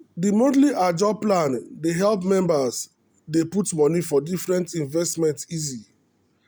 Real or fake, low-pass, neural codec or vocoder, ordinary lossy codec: real; none; none; none